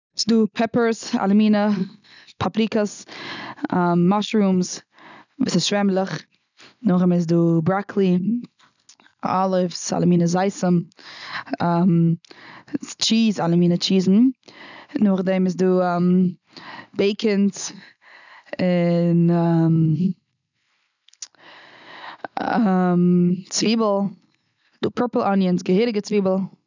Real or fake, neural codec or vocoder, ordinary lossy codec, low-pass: real; none; none; 7.2 kHz